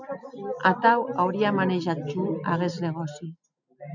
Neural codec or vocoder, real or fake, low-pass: none; real; 7.2 kHz